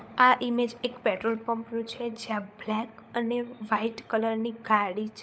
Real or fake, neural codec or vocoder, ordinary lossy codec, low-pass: fake; codec, 16 kHz, 16 kbps, FunCodec, trained on LibriTTS, 50 frames a second; none; none